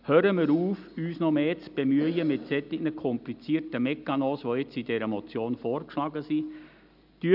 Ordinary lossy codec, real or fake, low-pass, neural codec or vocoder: none; real; 5.4 kHz; none